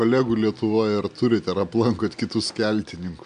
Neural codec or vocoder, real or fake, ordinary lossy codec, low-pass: none; real; MP3, 96 kbps; 9.9 kHz